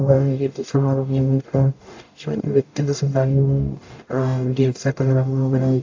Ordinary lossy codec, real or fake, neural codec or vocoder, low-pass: AAC, 48 kbps; fake; codec, 44.1 kHz, 0.9 kbps, DAC; 7.2 kHz